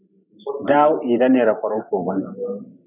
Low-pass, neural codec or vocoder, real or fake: 3.6 kHz; vocoder, 44.1 kHz, 128 mel bands every 512 samples, BigVGAN v2; fake